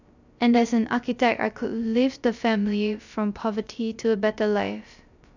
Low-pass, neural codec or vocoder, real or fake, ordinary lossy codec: 7.2 kHz; codec, 16 kHz, 0.3 kbps, FocalCodec; fake; none